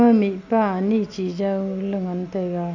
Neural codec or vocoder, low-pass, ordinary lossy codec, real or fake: none; 7.2 kHz; none; real